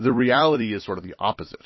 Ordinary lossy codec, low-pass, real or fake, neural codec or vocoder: MP3, 24 kbps; 7.2 kHz; fake; vocoder, 44.1 kHz, 128 mel bands every 256 samples, BigVGAN v2